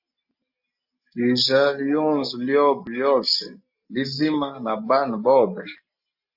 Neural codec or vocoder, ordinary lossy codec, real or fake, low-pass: none; AAC, 48 kbps; real; 5.4 kHz